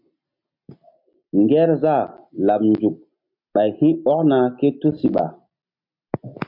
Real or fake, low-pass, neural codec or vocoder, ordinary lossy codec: real; 5.4 kHz; none; MP3, 48 kbps